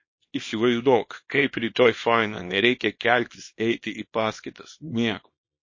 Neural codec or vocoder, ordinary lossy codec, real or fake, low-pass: codec, 24 kHz, 0.9 kbps, WavTokenizer, small release; MP3, 32 kbps; fake; 7.2 kHz